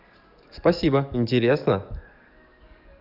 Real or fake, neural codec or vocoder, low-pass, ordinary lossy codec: fake; codec, 44.1 kHz, 7.8 kbps, DAC; 5.4 kHz; none